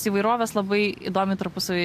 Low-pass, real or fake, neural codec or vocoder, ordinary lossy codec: 14.4 kHz; real; none; MP3, 64 kbps